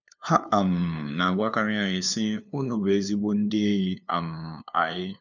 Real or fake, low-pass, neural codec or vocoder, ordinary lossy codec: fake; 7.2 kHz; codec, 16 kHz, 2 kbps, FunCodec, trained on LibriTTS, 25 frames a second; none